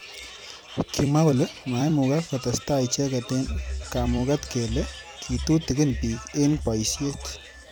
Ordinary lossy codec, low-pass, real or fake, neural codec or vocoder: none; none; fake; vocoder, 44.1 kHz, 128 mel bands every 256 samples, BigVGAN v2